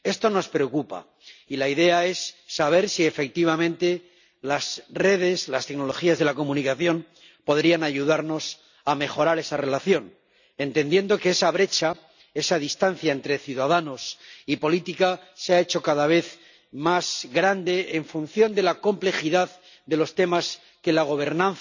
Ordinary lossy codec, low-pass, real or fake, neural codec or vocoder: none; 7.2 kHz; real; none